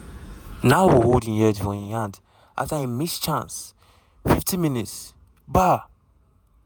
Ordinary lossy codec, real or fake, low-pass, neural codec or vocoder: none; fake; none; vocoder, 48 kHz, 128 mel bands, Vocos